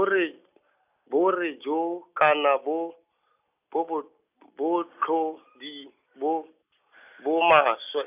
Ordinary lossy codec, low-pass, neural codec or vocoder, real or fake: none; 3.6 kHz; none; real